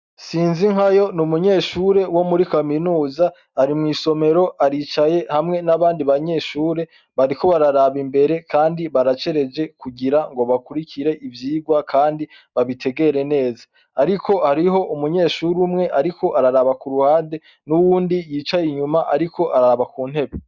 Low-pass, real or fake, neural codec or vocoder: 7.2 kHz; real; none